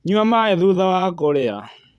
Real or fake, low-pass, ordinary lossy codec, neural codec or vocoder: fake; none; none; vocoder, 22.05 kHz, 80 mel bands, Vocos